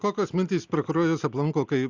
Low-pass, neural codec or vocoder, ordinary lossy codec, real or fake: 7.2 kHz; none; Opus, 64 kbps; real